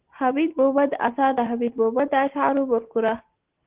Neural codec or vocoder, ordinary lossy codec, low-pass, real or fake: none; Opus, 16 kbps; 3.6 kHz; real